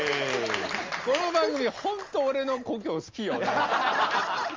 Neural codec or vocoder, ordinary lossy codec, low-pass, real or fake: none; Opus, 32 kbps; 7.2 kHz; real